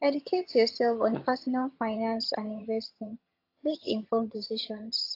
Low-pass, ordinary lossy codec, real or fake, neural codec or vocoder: 5.4 kHz; AAC, 32 kbps; fake; vocoder, 22.05 kHz, 80 mel bands, HiFi-GAN